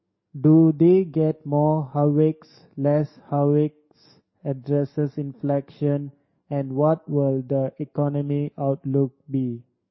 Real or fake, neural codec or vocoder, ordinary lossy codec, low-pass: fake; codec, 44.1 kHz, 7.8 kbps, DAC; MP3, 24 kbps; 7.2 kHz